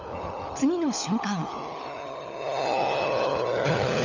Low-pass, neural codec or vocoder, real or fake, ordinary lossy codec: 7.2 kHz; codec, 16 kHz, 16 kbps, FunCodec, trained on LibriTTS, 50 frames a second; fake; none